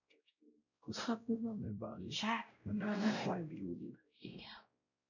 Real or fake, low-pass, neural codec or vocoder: fake; 7.2 kHz; codec, 16 kHz, 0.5 kbps, X-Codec, WavLM features, trained on Multilingual LibriSpeech